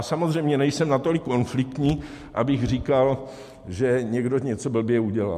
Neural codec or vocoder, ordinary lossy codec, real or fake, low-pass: none; MP3, 64 kbps; real; 14.4 kHz